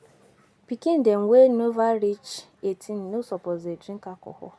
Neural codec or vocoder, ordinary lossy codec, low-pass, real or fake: none; none; none; real